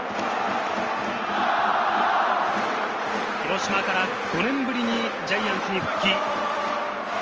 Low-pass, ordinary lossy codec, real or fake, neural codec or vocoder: 7.2 kHz; Opus, 24 kbps; real; none